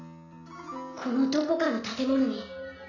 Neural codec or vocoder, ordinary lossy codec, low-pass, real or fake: none; none; 7.2 kHz; real